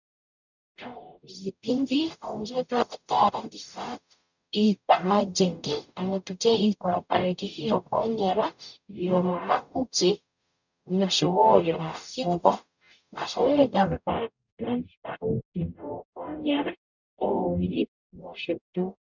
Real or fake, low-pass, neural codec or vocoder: fake; 7.2 kHz; codec, 44.1 kHz, 0.9 kbps, DAC